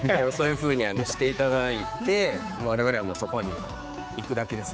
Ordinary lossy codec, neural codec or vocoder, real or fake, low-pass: none; codec, 16 kHz, 4 kbps, X-Codec, HuBERT features, trained on general audio; fake; none